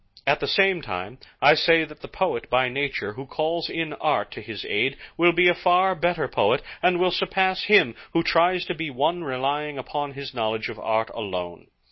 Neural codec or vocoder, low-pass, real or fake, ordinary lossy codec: none; 7.2 kHz; real; MP3, 24 kbps